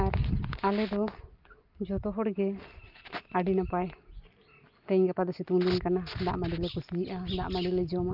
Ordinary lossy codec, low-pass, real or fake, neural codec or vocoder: Opus, 32 kbps; 5.4 kHz; real; none